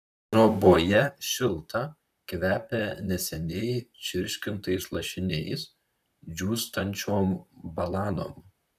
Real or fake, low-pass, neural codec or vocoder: fake; 14.4 kHz; vocoder, 44.1 kHz, 128 mel bands, Pupu-Vocoder